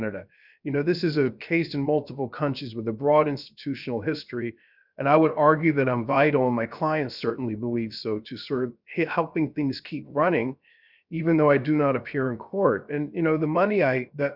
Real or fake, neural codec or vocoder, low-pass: fake; codec, 16 kHz, 0.7 kbps, FocalCodec; 5.4 kHz